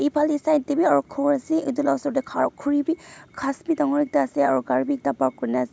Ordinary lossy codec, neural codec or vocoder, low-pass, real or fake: none; none; none; real